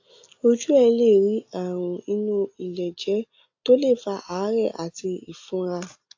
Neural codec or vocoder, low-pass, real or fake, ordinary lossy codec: none; 7.2 kHz; real; AAC, 48 kbps